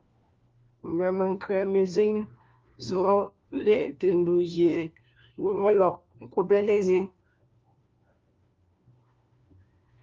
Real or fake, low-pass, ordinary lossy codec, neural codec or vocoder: fake; 7.2 kHz; Opus, 24 kbps; codec, 16 kHz, 1 kbps, FunCodec, trained on LibriTTS, 50 frames a second